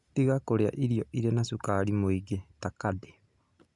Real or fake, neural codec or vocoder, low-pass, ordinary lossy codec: real; none; 10.8 kHz; none